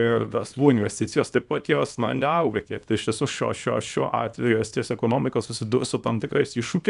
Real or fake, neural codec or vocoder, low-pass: fake; codec, 24 kHz, 0.9 kbps, WavTokenizer, small release; 10.8 kHz